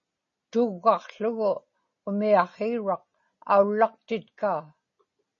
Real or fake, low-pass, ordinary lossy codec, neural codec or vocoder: real; 7.2 kHz; MP3, 32 kbps; none